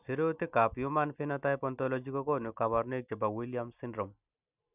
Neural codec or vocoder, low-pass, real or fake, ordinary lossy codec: none; 3.6 kHz; real; none